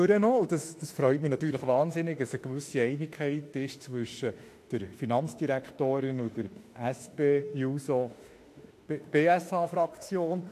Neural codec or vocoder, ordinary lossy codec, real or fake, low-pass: autoencoder, 48 kHz, 32 numbers a frame, DAC-VAE, trained on Japanese speech; AAC, 64 kbps; fake; 14.4 kHz